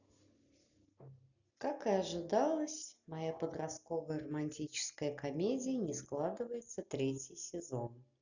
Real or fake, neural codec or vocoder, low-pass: real; none; 7.2 kHz